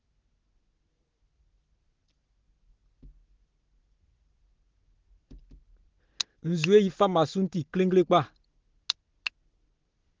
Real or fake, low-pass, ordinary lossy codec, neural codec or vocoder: real; 7.2 kHz; Opus, 16 kbps; none